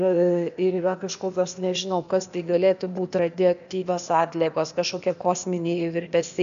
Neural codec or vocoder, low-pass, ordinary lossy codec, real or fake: codec, 16 kHz, 0.8 kbps, ZipCodec; 7.2 kHz; AAC, 96 kbps; fake